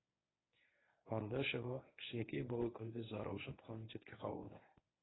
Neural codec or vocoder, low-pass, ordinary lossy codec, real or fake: codec, 24 kHz, 0.9 kbps, WavTokenizer, medium speech release version 1; 7.2 kHz; AAC, 16 kbps; fake